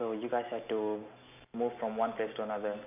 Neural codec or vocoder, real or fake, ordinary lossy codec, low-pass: none; real; none; 3.6 kHz